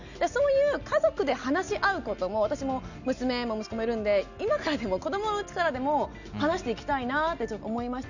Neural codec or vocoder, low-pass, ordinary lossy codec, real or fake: none; 7.2 kHz; none; real